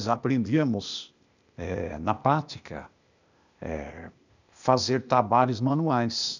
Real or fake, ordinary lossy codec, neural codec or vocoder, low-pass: fake; none; codec, 16 kHz, 0.8 kbps, ZipCodec; 7.2 kHz